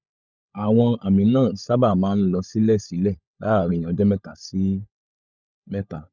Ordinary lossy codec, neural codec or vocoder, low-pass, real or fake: none; codec, 16 kHz, 16 kbps, FunCodec, trained on LibriTTS, 50 frames a second; 7.2 kHz; fake